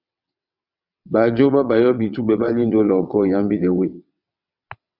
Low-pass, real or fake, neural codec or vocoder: 5.4 kHz; fake; vocoder, 22.05 kHz, 80 mel bands, WaveNeXt